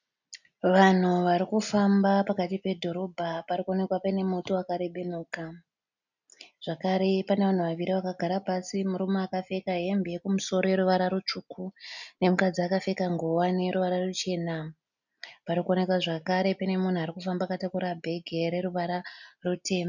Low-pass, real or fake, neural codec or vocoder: 7.2 kHz; real; none